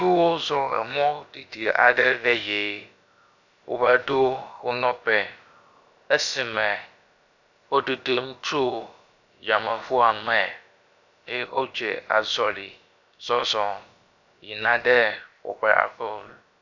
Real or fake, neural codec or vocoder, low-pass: fake; codec, 16 kHz, about 1 kbps, DyCAST, with the encoder's durations; 7.2 kHz